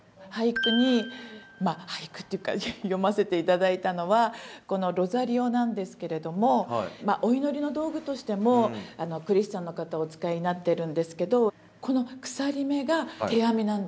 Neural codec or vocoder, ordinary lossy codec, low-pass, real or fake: none; none; none; real